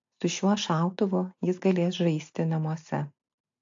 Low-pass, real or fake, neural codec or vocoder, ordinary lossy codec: 7.2 kHz; real; none; AAC, 48 kbps